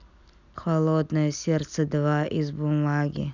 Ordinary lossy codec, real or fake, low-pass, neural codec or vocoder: none; real; 7.2 kHz; none